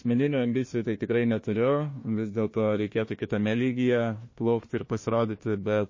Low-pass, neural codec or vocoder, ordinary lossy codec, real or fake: 7.2 kHz; codec, 16 kHz, 1 kbps, FunCodec, trained on Chinese and English, 50 frames a second; MP3, 32 kbps; fake